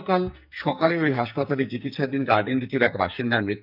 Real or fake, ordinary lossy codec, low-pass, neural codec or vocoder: fake; none; 5.4 kHz; codec, 44.1 kHz, 2.6 kbps, SNAC